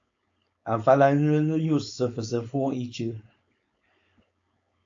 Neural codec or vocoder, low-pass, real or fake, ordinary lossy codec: codec, 16 kHz, 4.8 kbps, FACodec; 7.2 kHz; fake; AAC, 48 kbps